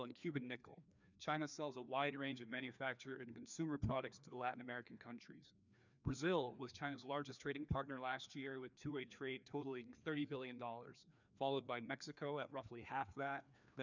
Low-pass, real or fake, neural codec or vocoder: 7.2 kHz; fake; codec, 16 kHz, 2 kbps, FreqCodec, larger model